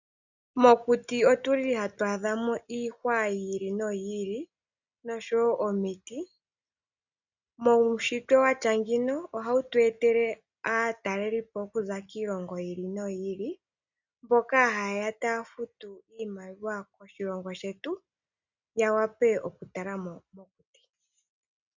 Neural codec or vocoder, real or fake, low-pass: none; real; 7.2 kHz